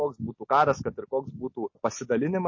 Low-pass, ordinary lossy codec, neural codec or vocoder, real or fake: 7.2 kHz; MP3, 32 kbps; none; real